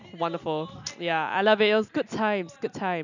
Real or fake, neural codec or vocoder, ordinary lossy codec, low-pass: real; none; none; 7.2 kHz